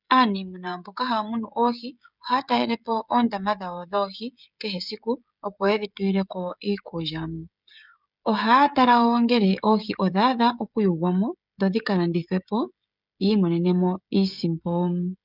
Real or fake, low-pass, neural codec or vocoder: fake; 5.4 kHz; codec, 16 kHz, 16 kbps, FreqCodec, smaller model